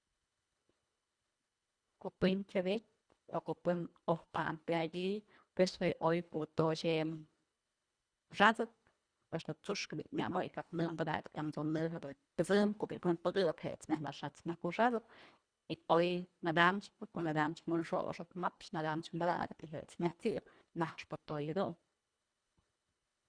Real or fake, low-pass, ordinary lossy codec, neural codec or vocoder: fake; 9.9 kHz; Opus, 64 kbps; codec, 24 kHz, 1.5 kbps, HILCodec